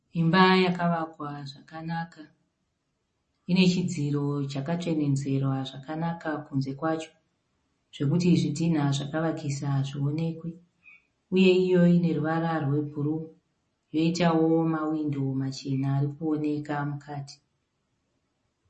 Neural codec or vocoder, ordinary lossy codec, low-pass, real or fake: none; MP3, 32 kbps; 10.8 kHz; real